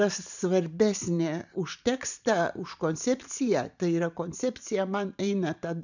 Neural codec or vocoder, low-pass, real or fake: vocoder, 44.1 kHz, 128 mel bands every 512 samples, BigVGAN v2; 7.2 kHz; fake